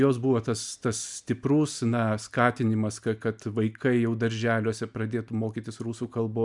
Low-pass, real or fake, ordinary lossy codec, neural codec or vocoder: 10.8 kHz; real; MP3, 96 kbps; none